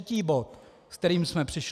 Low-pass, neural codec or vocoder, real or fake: 14.4 kHz; none; real